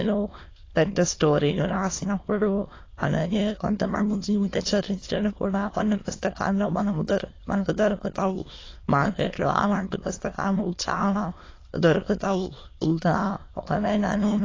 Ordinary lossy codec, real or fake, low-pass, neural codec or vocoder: AAC, 32 kbps; fake; 7.2 kHz; autoencoder, 22.05 kHz, a latent of 192 numbers a frame, VITS, trained on many speakers